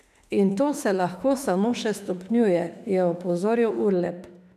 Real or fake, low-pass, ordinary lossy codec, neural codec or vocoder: fake; 14.4 kHz; none; autoencoder, 48 kHz, 32 numbers a frame, DAC-VAE, trained on Japanese speech